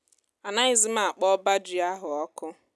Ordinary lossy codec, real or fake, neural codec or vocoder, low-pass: none; real; none; none